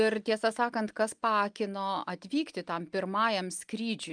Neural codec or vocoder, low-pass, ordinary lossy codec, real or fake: none; 9.9 kHz; Opus, 32 kbps; real